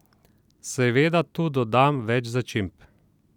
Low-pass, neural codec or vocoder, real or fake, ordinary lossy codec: 19.8 kHz; vocoder, 44.1 kHz, 128 mel bands every 256 samples, BigVGAN v2; fake; none